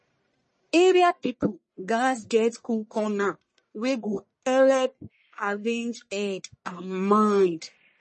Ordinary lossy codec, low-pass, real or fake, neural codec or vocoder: MP3, 32 kbps; 10.8 kHz; fake; codec, 44.1 kHz, 1.7 kbps, Pupu-Codec